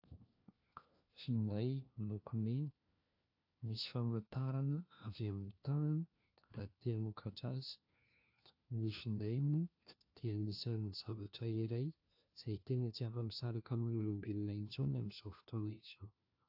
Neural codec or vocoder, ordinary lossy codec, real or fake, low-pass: codec, 16 kHz, 1 kbps, FunCodec, trained on LibriTTS, 50 frames a second; AAC, 48 kbps; fake; 5.4 kHz